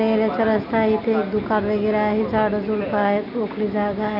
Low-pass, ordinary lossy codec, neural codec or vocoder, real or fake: 5.4 kHz; none; none; real